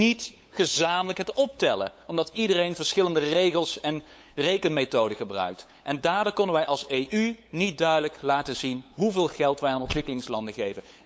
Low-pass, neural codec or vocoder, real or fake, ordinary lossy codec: none; codec, 16 kHz, 8 kbps, FunCodec, trained on LibriTTS, 25 frames a second; fake; none